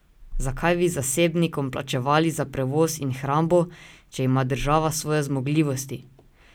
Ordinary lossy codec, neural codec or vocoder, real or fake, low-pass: none; none; real; none